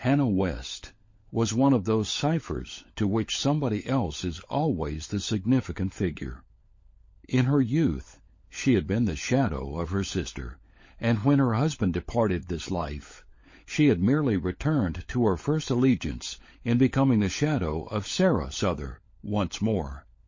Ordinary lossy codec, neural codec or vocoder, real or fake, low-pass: MP3, 32 kbps; codec, 16 kHz, 16 kbps, FunCodec, trained on LibriTTS, 50 frames a second; fake; 7.2 kHz